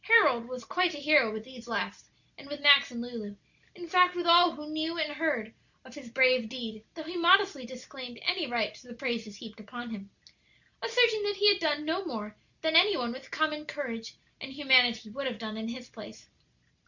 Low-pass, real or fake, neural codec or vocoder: 7.2 kHz; real; none